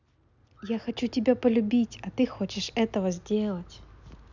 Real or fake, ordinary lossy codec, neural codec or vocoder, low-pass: real; none; none; 7.2 kHz